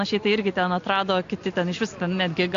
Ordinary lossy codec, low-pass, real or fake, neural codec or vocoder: AAC, 48 kbps; 7.2 kHz; real; none